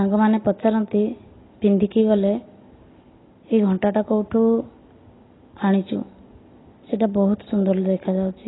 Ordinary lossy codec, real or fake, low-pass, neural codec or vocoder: AAC, 16 kbps; real; 7.2 kHz; none